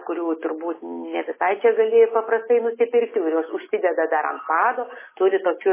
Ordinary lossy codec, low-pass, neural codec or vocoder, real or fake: MP3, 16 kbps; 3.6 kHz; none; real